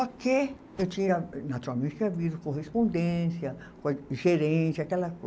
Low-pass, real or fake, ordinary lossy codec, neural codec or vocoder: none; real; none; none